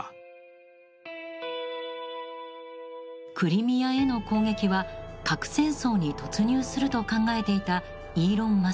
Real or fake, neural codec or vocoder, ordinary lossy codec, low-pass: real; none; none; none